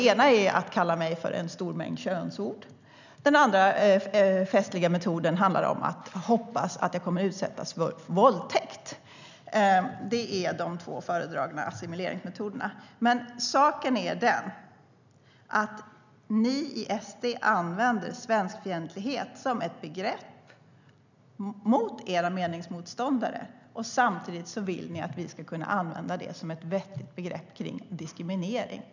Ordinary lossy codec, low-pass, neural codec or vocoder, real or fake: none; 7.2 kHz; none; real